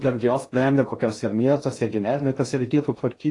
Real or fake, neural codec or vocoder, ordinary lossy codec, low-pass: fake; codec, 16 kHz in and 24 kHz out, 0.6 kbps, FocalCodec, streaming, 4096 codes; AAC, 48 kbps; 10.8 kHz